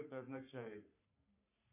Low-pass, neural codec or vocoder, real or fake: 3.6 kHz; codec, 44.1 kHz, 3.4 kbps, Pupu-Codec; fake